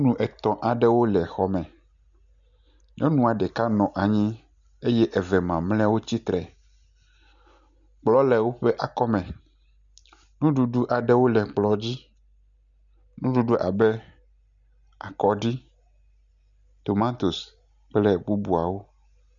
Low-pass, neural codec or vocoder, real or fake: 7.2 kHz; none; real